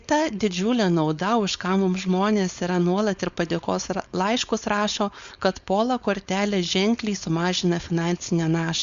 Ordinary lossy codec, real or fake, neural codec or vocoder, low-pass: Opus, 64 kbps; fake; codec, 16 kHz, 4.8 kbps, FACodec; 7.2 kHz